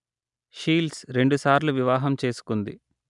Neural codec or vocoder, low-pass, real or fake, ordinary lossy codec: none; 10.8 kHz; real; none